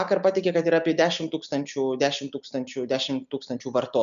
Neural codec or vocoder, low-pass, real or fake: none; 7.2 kHz; real